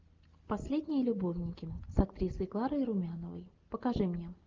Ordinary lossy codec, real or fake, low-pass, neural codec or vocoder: Opus, 32 kbps; real; 7.2 kHz; none